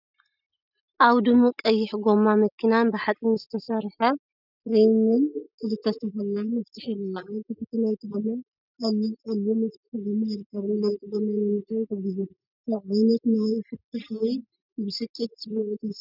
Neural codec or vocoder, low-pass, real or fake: none; 5.4 kHz; real